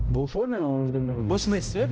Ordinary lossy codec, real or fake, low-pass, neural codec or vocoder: none; fake; none; codec, 16 kHz, 0.5 kbps, X-Codec, HuBERT features, trained on balanced general audio